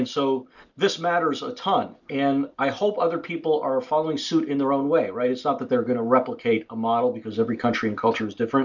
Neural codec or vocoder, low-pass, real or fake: none; 7.2 kHz; real